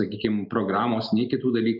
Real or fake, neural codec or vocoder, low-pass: real; none; 5.4 kHz